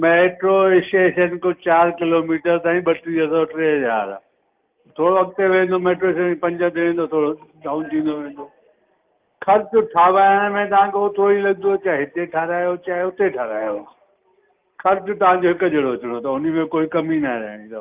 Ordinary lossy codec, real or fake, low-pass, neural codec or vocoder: Opus, 32 kbps; real; 3.6 kHz; none